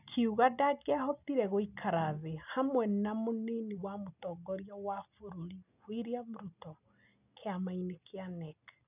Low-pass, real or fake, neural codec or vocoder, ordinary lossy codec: 3.6 kHz; real; none; none